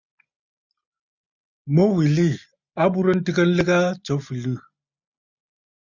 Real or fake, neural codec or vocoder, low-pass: real; none; 7.2 kHz